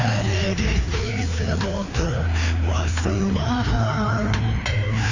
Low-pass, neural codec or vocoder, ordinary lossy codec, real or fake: 7.2 kHz; codec, 16 kHz, 2 kbps, FreqCodec, larger model; AAC, 48 kbps; fake